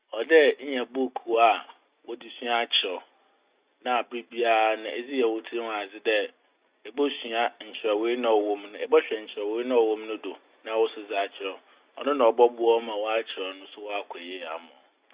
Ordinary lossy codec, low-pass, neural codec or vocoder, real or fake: Opus, 64 kbps; 3.6 kHz; none; real